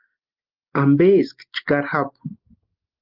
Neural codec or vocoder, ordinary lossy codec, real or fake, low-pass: none; Opus, 32 kbps; real; 5.4 kHz